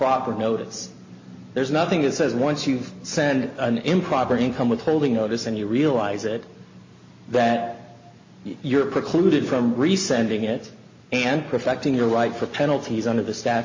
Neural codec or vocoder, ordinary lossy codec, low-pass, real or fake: none; MP3, 32 kbps; 7.2 kHz; real